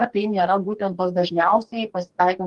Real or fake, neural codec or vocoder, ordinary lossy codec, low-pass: fake; codec, 44.1 kHz, 2.6 kbps, DAC; Opus, 24 kbps; 10.8 kHz